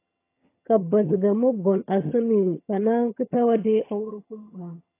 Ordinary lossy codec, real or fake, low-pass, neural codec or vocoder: AAC, 24 kbps; fake; 3.6 kHz; vocoder, 22.05 kHz, 80 mel bands, HiFi-GAN